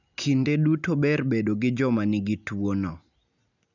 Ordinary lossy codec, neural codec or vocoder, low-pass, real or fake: none; none; 7.2 kHz; real